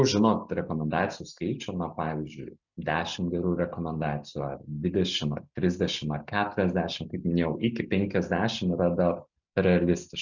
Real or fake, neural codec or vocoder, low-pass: real; none; 7.2 kHz